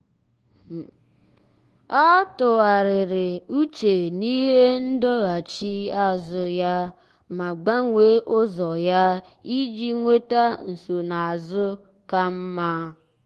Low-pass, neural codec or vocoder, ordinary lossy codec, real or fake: 10.8 kHz; codec, 24 kHz, 1.2 kbps, DualCodec; Opus, 16 kbps; fake